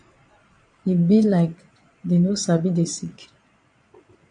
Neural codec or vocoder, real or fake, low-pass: vocoder, 22.05 kHz, 80 mel bands, Vocos; fake; 9.9 kHz